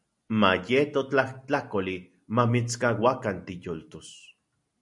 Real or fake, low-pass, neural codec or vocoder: real; 10.8 kHz; none